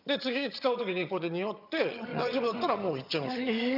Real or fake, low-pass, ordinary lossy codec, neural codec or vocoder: fake; 5.4 kHz; none; vocoder, 22.05 kHz, 80 mel bands, HiFi-GAN